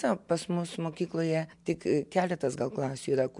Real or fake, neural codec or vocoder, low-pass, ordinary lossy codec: real; none; 10.8 kHz; MP3, 64 kbps